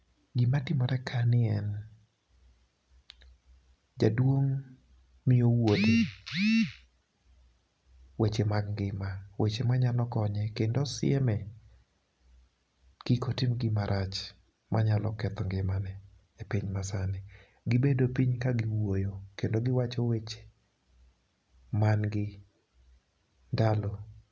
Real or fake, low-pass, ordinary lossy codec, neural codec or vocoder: real; none; none; none